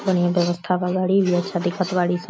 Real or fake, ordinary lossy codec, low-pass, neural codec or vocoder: real; none; none; none